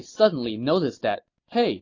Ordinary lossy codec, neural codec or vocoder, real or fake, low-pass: AAC, 32 kbps; none; real; 7.2 kHz